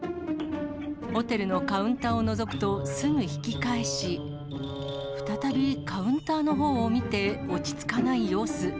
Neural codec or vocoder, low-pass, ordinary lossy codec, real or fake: none; none; none; real